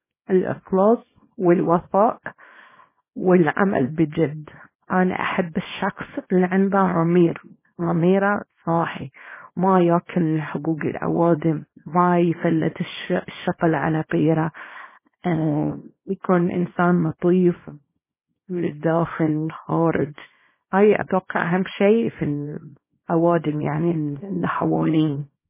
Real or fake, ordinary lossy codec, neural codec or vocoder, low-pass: fake; MP3, 16 kbps; codec, 24 kHz, 0.9 kbps, WavTokenizer, small release; 3.6 kHz